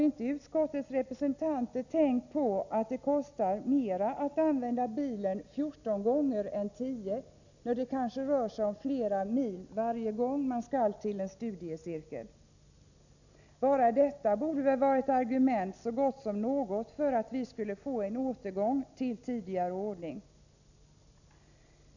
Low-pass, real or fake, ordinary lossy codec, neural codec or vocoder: 7.2 kHz; real; none; none